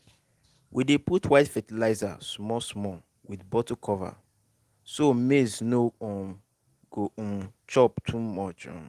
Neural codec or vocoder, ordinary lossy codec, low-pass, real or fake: none; Opus, 24 kbps; 14.4 kHz; real